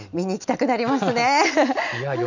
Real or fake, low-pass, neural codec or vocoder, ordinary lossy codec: real; 7.2 kHz; none; none